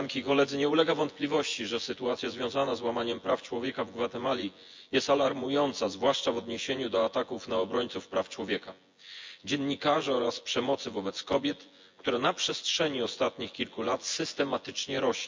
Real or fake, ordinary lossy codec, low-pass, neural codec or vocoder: fake; MP3, 64 kbps; 7.2 kHz; vocoder, 24 kHz, 100 mel bands, Vocos